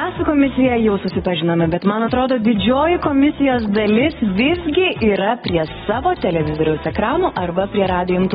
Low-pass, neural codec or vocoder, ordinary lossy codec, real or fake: 19.8 kHz; none; AAC, 16 kbps; real